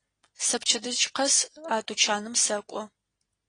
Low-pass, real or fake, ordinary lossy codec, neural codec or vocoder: 9.9 kHz; real; AAC, 32 kbps; none